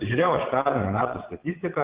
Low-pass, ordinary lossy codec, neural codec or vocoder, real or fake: 3.6 kHz; Opus, 16 kbps; vocoder, 22.05 kHz, 80 mel bands, WaveNeXt; fake